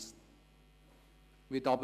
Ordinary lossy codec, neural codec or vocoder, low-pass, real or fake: none; none; 14.4 kHz; real